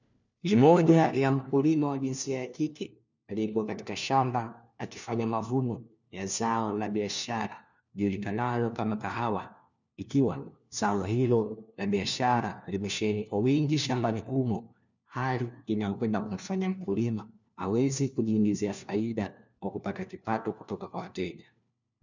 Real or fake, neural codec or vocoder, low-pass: fake; codec, 16 kHz, 1 kbps, FunCodec, trained on LibriTTS, 50 frames a second; 7.2 kHz